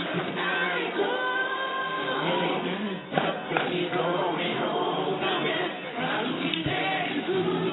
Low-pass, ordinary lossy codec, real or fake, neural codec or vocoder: 7.2 kHz; AAC, 16 kbps; fake; codec, 24 kHz, 0.9 kbps, WavTokenizer, medium music audio release